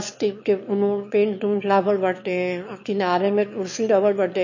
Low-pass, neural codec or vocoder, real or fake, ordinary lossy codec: 7.2 kHz; autoencoder, 22.05 kHz, a latent of 192 numbers a frame, VITS, trained on one speaker; fake; MP3, 32 kbps